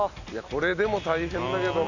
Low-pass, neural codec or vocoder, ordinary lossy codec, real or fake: 7.2 kHz; none; none; real